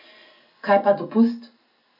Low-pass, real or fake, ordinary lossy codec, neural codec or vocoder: 5.4 kHz; real; none; none